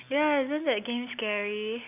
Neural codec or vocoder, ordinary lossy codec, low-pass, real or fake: none; none; 3.6 kHz; real